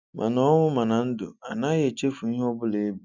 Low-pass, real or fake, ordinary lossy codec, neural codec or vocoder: 7.2 kHz; real; none; none